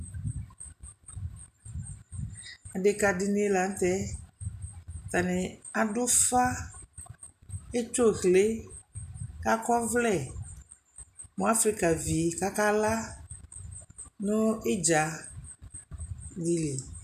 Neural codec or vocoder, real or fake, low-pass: none; real; 14.4 kHz